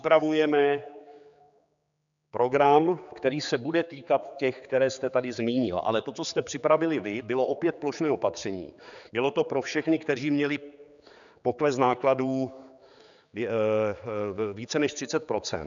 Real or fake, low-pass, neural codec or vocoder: fake; 7.2 kHz; codec, 16 kHz, 4 kbps, X-Codec, HuBERT features, trained on general audio